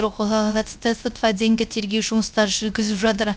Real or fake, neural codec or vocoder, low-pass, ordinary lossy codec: fake; codec, 16 kHz, 0.3 kbps, FocalCodec; none; none